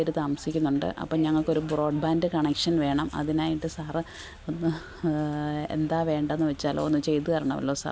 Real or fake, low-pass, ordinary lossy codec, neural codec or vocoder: real; none; none; none